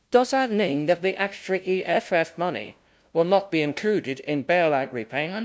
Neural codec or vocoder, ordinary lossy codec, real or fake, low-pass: codec, 16 kHz, 0.5 kbps, FunCodec, trained on LibriTTS, 25 frames a second; none; fake; none